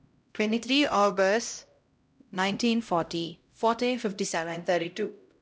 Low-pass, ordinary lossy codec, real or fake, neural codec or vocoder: none; none; fake; codec, 16 kHz, 0.5 kbps, X-Codec, HuBERT features, trained on LibriSpeech